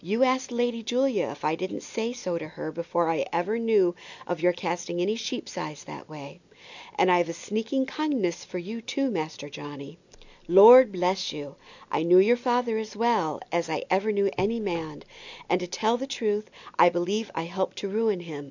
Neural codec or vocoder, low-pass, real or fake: none; 7.2 kHz; real